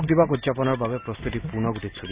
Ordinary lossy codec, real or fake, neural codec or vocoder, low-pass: Opus, 64 kbps; real; none; 3.6 kHz